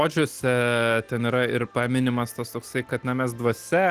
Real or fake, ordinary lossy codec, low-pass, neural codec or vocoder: real; Opus, 24 kbps; 14.4 kHz; none